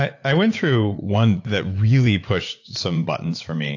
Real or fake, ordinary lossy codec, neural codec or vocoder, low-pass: fake; AAC, 48 kbps; vocoder, 44.1 kHz, 80 mel bands, Vocos; 7.2 kHz